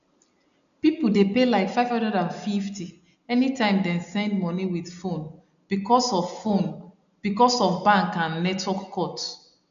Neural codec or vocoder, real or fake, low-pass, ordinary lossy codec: none; real; 7.2 kHz; none